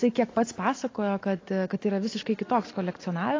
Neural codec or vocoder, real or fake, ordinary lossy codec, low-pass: none; real; AAC, 32 kbps; 7.2 kHz